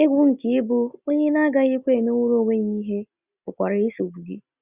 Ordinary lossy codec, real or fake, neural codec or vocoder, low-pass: Opus, 64 kbps; real; none; 3.6 kHz